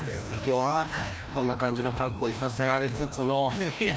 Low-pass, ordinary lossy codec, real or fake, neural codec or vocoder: none; none; fake; codec, 16 kHz, 1 kbps, FreqCodec, larger model